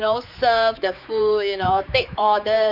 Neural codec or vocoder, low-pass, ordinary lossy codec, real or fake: codec, 16 kHz, 4 kbps, X-Codec, HuBERT features, trained on general audio; 5.4 kHz; none; fake